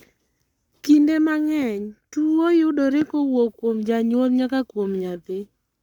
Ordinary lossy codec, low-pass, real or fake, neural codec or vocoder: none; 19.8 kHz; fake; codec, 44.1 kHz, 7.8 kbps, Pupu-Codec